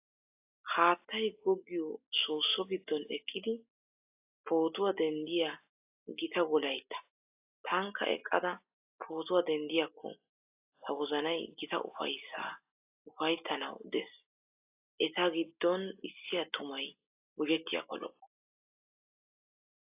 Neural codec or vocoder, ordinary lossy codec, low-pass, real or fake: none; AAC, 32 kbps; 3.6 kHz; real